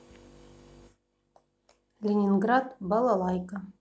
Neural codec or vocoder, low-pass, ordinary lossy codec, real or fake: none; none; none; real